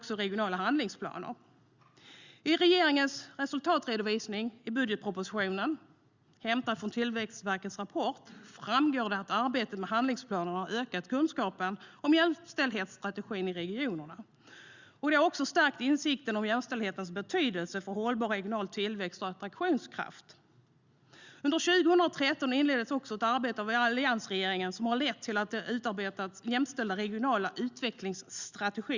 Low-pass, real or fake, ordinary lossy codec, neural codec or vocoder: 7.2 kHz; real; Opus, 64 kbps; none